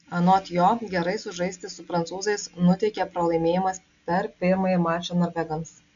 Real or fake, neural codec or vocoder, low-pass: real; none; 7.2 kHz